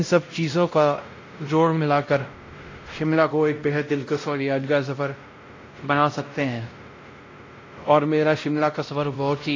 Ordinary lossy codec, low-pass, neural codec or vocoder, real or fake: AAC, 32 kbps; 7.2 kHz; codec, 16 kHz, 0.5 kbps, X-Codec, WavLM features, trained on Multilingual LibriSpeech; fake